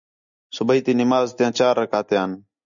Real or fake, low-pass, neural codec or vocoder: real; 7.2 kHz; none